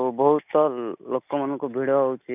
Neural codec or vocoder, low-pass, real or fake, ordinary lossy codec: none; 3.6 kHz; real; none